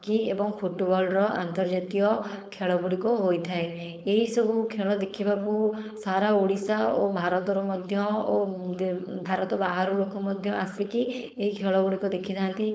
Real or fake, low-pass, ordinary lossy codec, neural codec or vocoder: fake; none; none; codec, 16 kHz, 4.8 kbps, FACodec